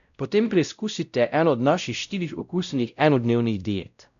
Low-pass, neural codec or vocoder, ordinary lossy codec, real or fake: 7.2 kHz; codec, 16 kHz, 0.5 kbps, X-Codec, WavLM features, trained on Multilingual LibriSpeech; none; fake